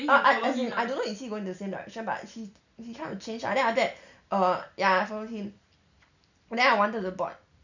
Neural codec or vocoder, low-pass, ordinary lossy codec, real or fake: none; 7.2 kHz; none; real